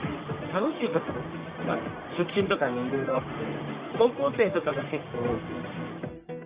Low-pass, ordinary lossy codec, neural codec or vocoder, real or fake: 3.6 kHz; Opus, 64 kbps; codec, 44.1 kHz, 1.7 kbps, Pupu-Codec; fake